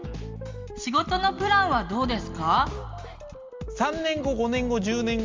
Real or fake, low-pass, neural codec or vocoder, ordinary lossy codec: real; 7.2 kHz; none; Opus, 32 kbps